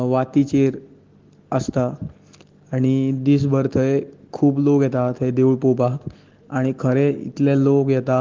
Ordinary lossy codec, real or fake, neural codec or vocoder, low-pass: Opus, 16 kbps; real; none; 7.2 kHz